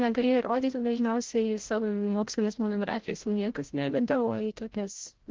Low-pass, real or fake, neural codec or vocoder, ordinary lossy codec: 7.2 kHz; fake; codec, 16 kHz, 0.5 kbps, FreqCodec, larger model; Opus, 16 kbps